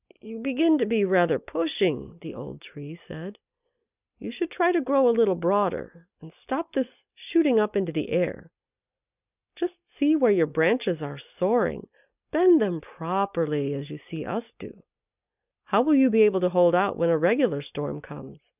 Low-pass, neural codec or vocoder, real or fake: 3.6 kHz; none; real